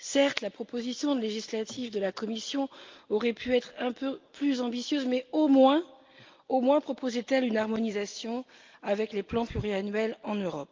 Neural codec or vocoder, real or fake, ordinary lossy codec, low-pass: none; real; Opus, 32 kbps; 7.2 kHz